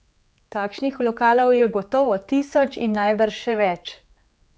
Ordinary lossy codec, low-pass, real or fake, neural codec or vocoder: none; none; fake; codec, 16 kHz, 4 kbps, X-Codec, HuBERT features, trained on general audio